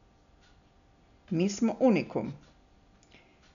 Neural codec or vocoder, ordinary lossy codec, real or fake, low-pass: none; none; real; 7.2 kHz